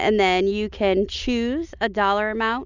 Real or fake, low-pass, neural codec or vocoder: real; 7.2 kHz; none